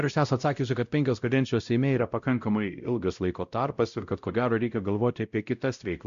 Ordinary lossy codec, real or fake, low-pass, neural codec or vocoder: Opus, 64 kbps; fake; 7.2 kHz; codec, 16 kHz, 0.5 kbps, X-Codec, WavLM features, trained on Multilingual LibriSpeech